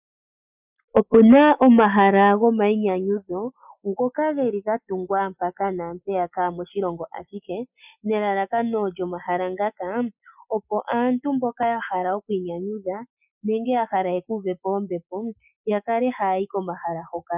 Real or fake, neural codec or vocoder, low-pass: real; none; 3.6 kHz